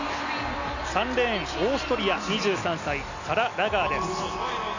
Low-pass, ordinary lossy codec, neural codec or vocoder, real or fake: 7.2 kHz; none; none; real